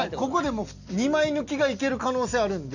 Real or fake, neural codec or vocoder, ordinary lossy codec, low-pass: real; none; none; 7.2 kHz